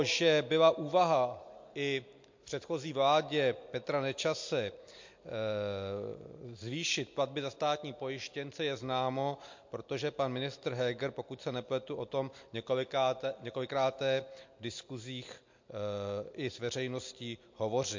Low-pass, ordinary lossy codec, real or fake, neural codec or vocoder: 7.2 kHz; MP3, 48 kbps; real; none